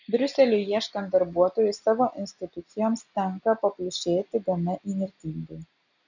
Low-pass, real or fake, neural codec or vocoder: 7.2 kHz; real; none